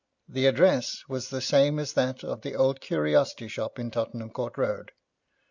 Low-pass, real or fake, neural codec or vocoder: 7.2 kHz; real; none